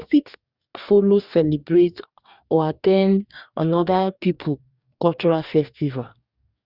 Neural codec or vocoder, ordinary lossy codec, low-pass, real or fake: codec, 44.1 kHz, 2.6 kbps, DAC; Opus, 64 kbps; 5.4 kHz; fake